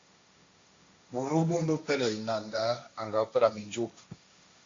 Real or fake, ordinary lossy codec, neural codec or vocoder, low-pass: fake; AAC, 48 kbps; codec, 16 kHz, 1.1 kbps, Voila-Tokenizer; 7.2 kHz